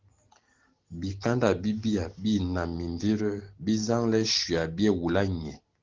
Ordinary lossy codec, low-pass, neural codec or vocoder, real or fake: Opus, 16 kbps; 7.2 kHz; none; real